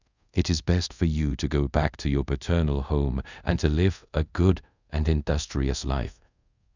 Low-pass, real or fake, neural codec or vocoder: 7.2 kHz; fake; codec, 24 kHz, 0.5 kbps, DualCodec